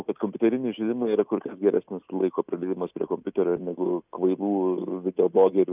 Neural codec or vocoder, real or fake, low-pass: none; real; 3.6 kHz